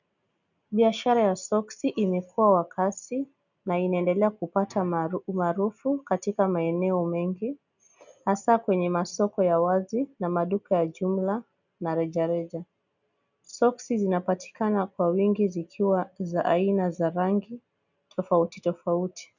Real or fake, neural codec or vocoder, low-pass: real; none; 7.2 kHz